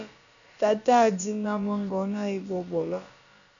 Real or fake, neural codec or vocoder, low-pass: fake; codec, 16 kHz, about 1 kbps, DyCAST, with the encoder's durations; 7.2 kHz